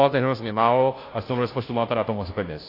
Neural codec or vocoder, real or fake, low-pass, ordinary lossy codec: codec, 16 kHz, 0.5 kbps, FunCodec, trained on Chinese and English, 25 frames a second; fake; 5.4 kHz; AAC, 24 kbps